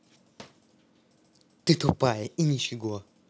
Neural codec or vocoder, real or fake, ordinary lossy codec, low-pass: none; real; none; none